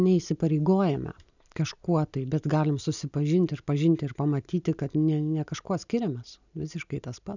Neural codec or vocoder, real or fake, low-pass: none; real; 7.2 kHz